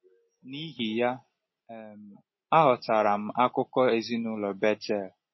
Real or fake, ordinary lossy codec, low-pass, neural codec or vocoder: real; MP3, 24 kbps; 7.2 kHz; none